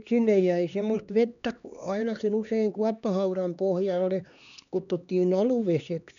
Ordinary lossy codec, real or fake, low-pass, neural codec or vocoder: none; fake; 7.2 kHz; codec, 16 kHz, 2 kbps, X-Codec, HuBERT features, trained on LibriSpeech